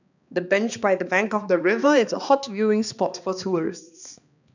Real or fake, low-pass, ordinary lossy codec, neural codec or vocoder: fake; 7.2 kHz; none; codec, 16 kHz, 2 kbps, X-Codec, HuBERT features, trained on balanced general audio